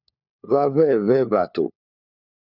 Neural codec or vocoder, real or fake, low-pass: codec, 16 kHz, 4 kbps, FunCodec, trained on LibriTTS, 50 frames a second; fake; 5.4 kHz